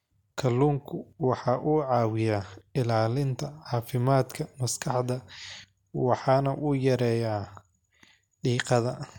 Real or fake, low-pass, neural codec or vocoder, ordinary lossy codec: real; 19.8 kHz; none; MP3, 96 kbps